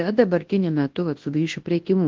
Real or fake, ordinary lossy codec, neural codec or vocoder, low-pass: fake; Opus, 16 kbps; codec, 24 kHz, 0.9 kbps, WavTokenizer, large speech release; 7.2 kHz